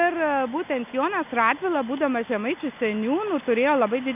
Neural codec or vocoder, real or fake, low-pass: none; real; 3.6 kHz